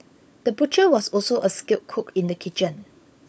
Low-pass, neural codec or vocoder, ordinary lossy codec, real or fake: none; codec, 16 kHz, 16 kbps, FunCodec, trained on LibriTTS, 50 frames a second; none; fake